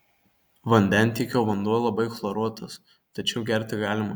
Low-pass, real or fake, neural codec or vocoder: 19.8 kHz; real; none